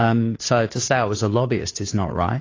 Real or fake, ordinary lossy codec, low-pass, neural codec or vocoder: fake; AAC, 32 kbps; 7.2 kHz; codec, 16 kHz, 2 kbps, FunCodec, trained on Chinese and English, 25 frames a second